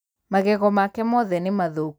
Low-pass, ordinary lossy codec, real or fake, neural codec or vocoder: none; none; real; none